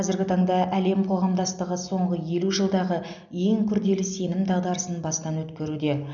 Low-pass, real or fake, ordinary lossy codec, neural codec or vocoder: 7.2 kHz; real; none; none